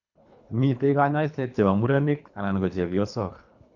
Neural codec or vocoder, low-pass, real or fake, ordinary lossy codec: codec, 24 kHz, 3 kbps, HILCodec; 7.2 kHz; fake; none